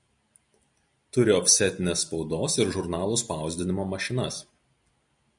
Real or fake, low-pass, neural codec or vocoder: real; 10.8 kHz; none